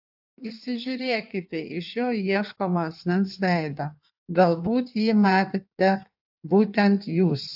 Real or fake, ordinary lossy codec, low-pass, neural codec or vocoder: fake; AAC, 48 kbps; 5.4 kHz; codec, 16 kHz in and 24 kHz out, 1.1 kbps, FireRedTTS-2 codec